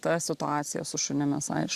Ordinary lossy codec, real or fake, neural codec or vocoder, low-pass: Opus, 64 kbps; real; none; 14.4 kHz